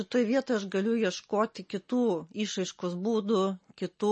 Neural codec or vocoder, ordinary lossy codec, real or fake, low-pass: none; MP3, 32 kbps; real; 10.8 kHz